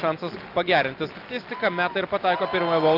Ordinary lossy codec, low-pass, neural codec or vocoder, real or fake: Opus, 24 kbps; 5.4 kHz; none; real